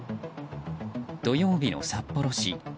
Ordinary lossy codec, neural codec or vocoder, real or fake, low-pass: none; none; real; none